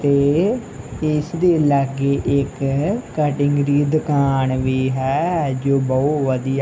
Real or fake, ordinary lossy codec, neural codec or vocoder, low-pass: real; none; none; none